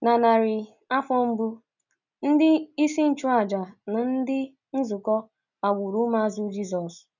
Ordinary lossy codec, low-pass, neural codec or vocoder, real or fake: none; 7.2 kHz; none; real